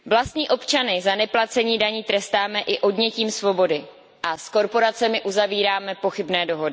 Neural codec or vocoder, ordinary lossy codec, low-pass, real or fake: none; none; none; real